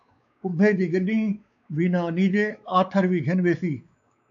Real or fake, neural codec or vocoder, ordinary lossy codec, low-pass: fake; codec, 16 kHz, 4 kbps, X-Codec, WavLM features, trained on Multilingual LibriSpeech; AAC, 64 kbps; 7.2 kHz